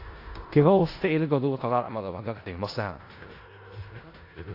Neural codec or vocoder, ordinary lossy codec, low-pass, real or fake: codec, 16 kHz in and 24 kHz out, 0.4 kbps, LongCat-Audio-Codec, four codebook decoder; AAC, 32 kbps; 5.4 kHz; fake